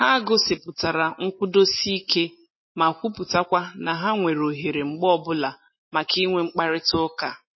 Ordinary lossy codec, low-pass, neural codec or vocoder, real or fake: MP3, 24 kbps; 7.2 kHz; none; real